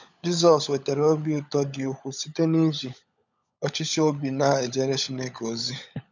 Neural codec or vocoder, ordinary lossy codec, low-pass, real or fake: codec, 16 kHz, 16 kbps, FunCodec, trained on LibriTTS, 50 frames a second; none; 7.2 kHz; fake